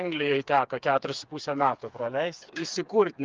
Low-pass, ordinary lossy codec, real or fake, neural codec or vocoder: 7.2 kHz; Opus, 24 kbps; fake; codec, 16 kHz, 4 kbps, FreqCodec, smaller model